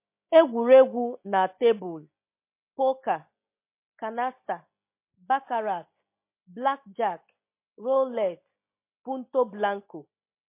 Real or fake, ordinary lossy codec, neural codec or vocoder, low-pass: real; MP3, 24 kbps; none; 3.6 kHz